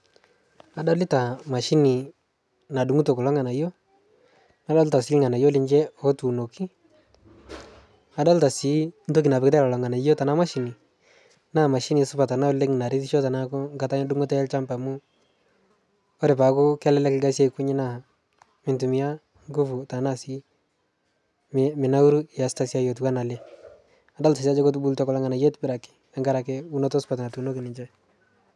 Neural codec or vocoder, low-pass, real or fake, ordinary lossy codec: none; none; real; none